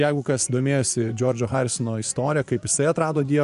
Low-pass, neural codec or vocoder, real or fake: 10.8 kHz; vocoder, 24 kHz, 100 mel bands, Vocos; fake